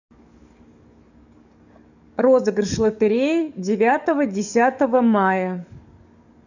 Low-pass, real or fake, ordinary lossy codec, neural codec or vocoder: 7.2 kHz; fake; none; codec, 44.1 kHz, 7.8 kbps, DAC